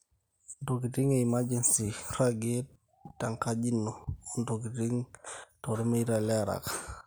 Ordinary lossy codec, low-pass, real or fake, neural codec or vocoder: none; none; real; none